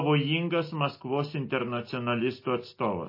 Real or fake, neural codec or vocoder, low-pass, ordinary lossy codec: real; none; 5.4 kHz; MP3, 24 kbps